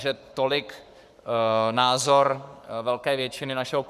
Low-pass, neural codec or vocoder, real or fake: 14.4 kHz; codec, 44.1 kHz, 7.8 kbps, Pupu-Codec; fake